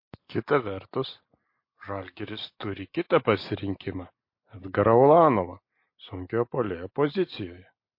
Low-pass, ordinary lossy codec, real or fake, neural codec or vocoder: 5.4 kHz; MP3, 32 kbps; real; none